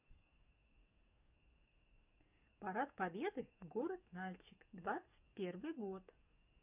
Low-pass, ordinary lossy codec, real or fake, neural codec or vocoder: 3.6 kHz; none; fake; codec, 44.1 kHz, 7.8 kbps, DAC